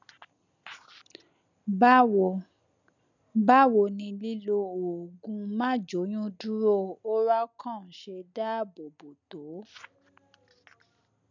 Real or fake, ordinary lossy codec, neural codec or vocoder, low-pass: real; none; none; 7.2 kHz